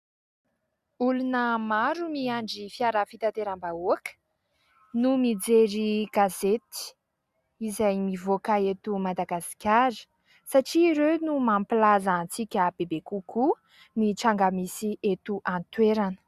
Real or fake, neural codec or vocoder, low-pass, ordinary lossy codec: real; none; 14.4 kHz; Opus, 64 kbps